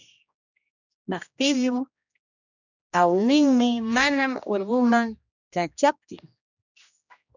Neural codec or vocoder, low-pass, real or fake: codec, 16 kHz, 1 kbps, X-Codec, HuBERT features, trained on general audio; 7.2 kHz; fake